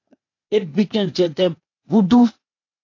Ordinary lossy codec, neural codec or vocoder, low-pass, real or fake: AAC, 48 kbps; codec, 16 kHz, 0.8 kbps, ZipCodec; 7.2 kHz; fake